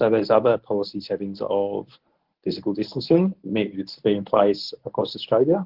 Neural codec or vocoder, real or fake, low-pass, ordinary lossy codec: codec, 24 kHz, 0.9 kbps, WavTokenizer, medium speech release version 1; fake; 5.4 kHz; Opus, 16 kbps